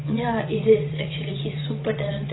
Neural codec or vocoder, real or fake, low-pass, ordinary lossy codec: vocoder, 22.05 kHz, 80 mel bands, WaveNeXt; fake; 7.2 kHz; AAC, 16 kbps